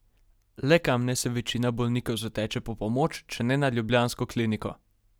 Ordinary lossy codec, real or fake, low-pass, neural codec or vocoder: none; real; none; none